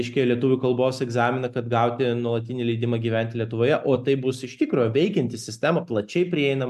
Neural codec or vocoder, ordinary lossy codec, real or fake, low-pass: none; AAC, 96 kbps; real; 14.4 kHz